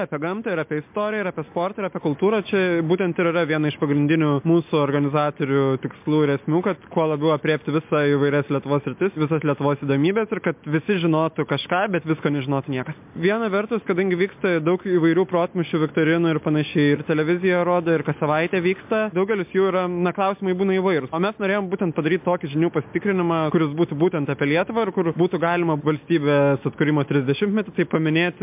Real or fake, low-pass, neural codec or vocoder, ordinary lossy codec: real; 3.6 kHz; none; MP3, 32 kbps